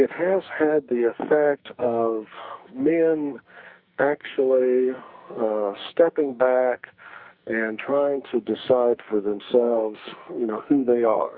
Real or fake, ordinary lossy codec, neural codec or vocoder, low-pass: fake; Opus, 64 kbps; codec, 44.1 kHz, 2.6 kbps, SNAC; 5.4 kHz